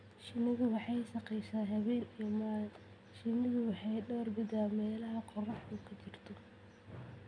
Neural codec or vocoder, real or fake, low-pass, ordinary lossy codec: none; real; 9.9 kHz; none